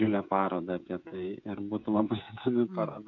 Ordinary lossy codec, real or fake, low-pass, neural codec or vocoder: MP3, 48 kbps; fake; 7.2 kHz; codec, 16 kHz, 16 kbps, FreqCodec, smaller model